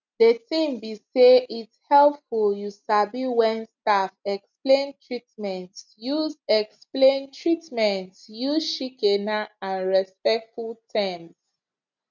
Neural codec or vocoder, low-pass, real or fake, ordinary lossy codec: none; 7.2 kHz; real; none